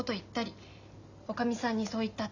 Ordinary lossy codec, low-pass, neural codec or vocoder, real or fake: none; 7.2 kHz; none; real